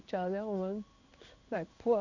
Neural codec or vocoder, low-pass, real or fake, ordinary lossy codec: codec, 16 kHz in and 24 kHz out, 1 kbps, XY-Tokenizer; 7.2 kHz; fake; none